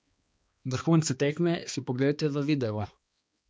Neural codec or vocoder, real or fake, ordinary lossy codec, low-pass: codec, 16 kHz, 2 kbps, X-Codec, HuBERT features, trained on balanced general audio; fake; none; none